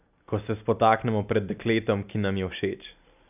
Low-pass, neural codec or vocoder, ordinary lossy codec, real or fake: 3.6 kHz; none; none; real